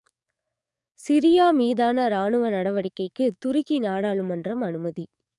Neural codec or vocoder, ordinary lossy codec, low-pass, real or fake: codec, 44.1 kHz, 7.8 kbps, DAC; none; 10.8 kHz; fake